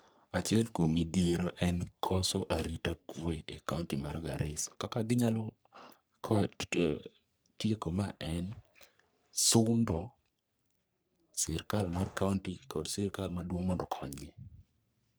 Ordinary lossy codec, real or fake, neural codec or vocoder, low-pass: none; fake; codec, 44.1 kHz, 3.4 kbps, Pupu-Codec; none